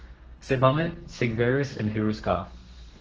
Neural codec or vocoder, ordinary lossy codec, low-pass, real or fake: codec, 32 kHz, 1.9 kbps, SNAC; Opus, 16 kbps; 7.2 kHz; fake